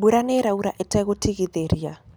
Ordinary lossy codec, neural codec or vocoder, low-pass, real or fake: none; none; none; real